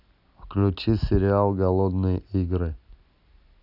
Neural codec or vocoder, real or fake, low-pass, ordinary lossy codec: none; real; 5.4 kHz; none